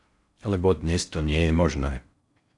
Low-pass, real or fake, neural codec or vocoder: 10.8 kHz; fake; codec, 16 kHz in and 24 kHz out, 0.6 kbps, FocalCodec, streaming, 4096 codes